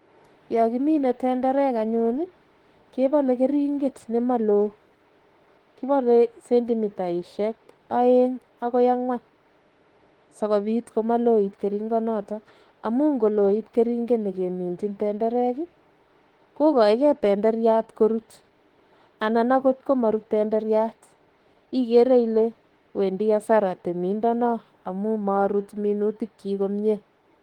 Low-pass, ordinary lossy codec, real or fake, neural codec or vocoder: 19.8 kHz; Opus, 16 kbps; fake; autoencoder, 48 kHz, 32 numbers a frame, DAC-VAE, trained on Japanese speech